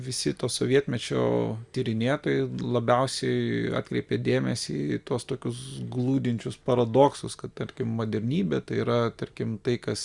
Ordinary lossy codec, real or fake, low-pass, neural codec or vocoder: Opus, 64 kbps; real; 10.8 kHz; none